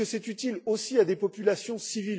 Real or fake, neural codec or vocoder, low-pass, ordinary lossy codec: real; none; none; none